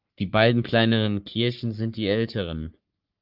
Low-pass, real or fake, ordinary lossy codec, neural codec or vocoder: 5.4 kHz; fake; Opus, 24 kbps; codec, 44.1 kHz, 3.4 kbps, Pupu-Codec